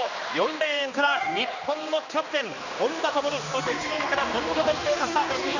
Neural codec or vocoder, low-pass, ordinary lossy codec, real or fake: codec, 16 kHz, 2 kbps, X-Codec, HuBERT features, trained on general audio; 7.2 kHz; none; fake